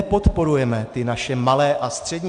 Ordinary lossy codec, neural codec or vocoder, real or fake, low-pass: AAC, 64 kbps; none; real; 9.9 kHz